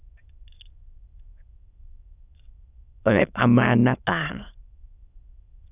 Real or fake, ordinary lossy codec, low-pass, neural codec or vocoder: fake; none; 3.6 kHz; autoencoder, 22.05 kHz, a latent of 192 numbers a frame, VITS, trained on many speakers